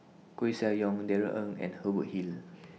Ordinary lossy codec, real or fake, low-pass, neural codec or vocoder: none; real; none; none